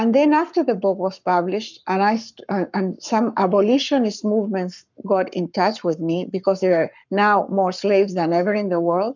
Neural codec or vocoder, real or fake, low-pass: codec, 44.1 kHz, 7.8 kbps, Pupu-Codec; fake; 7.2 kHz